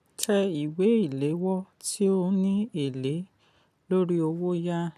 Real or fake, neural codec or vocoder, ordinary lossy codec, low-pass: real; none; none; 14.4 kHz